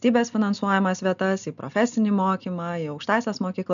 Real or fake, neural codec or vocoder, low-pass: real; none; 7.2 kHz